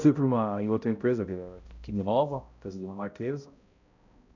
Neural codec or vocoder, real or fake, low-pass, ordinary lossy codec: codec, 16 kHz, 0.5 kbps, X-Codec, HuBERT features, trained on balanced general audio; fake; 7.2 kHz; none